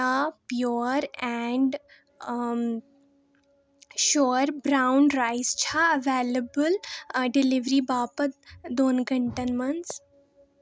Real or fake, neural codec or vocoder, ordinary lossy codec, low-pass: real; none; none; none